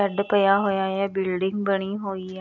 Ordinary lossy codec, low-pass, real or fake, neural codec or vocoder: none; 7.2 kHz; real; none